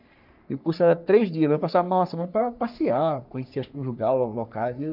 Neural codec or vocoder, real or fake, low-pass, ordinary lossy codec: codec, 44.1 kHz, 3.4 kbps, Pupu-Codec; fake; 5.4 kHz; none